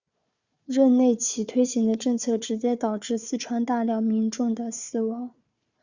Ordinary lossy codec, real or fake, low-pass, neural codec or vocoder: Opus, 64 kbps; fake; 7.2 kHz; codec, 16 kHz, 4 kbps, FunCodec, trained on Chinese and English, 50 frames a second